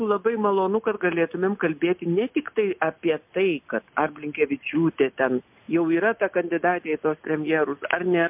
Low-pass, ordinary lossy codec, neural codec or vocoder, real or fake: 3.6 kHz; MP3, 32 kbps; none; real